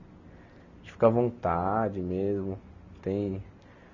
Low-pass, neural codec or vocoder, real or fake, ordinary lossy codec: 7.2 kHz; none; real; none